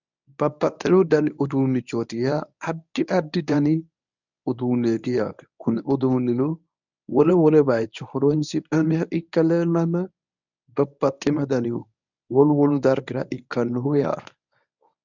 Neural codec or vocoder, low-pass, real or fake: codec, 24 kHz, 0.9 kbps, WavTokenizer, medium speech release version 1; 7.2 kHz; fake